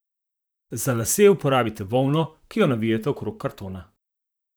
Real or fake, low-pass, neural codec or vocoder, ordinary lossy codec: fake; none; vocoder, 44.1 kHz, 128 mel bands, Pupu-Vocoder; none